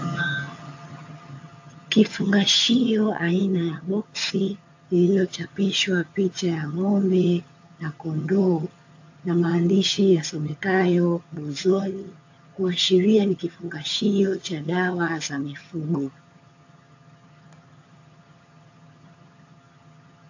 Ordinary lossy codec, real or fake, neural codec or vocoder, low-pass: AAC, 48 kbps; fake; vocoder, 22.05 kHz, 80 mel bands, HiFi-GAN; 7.2 kHz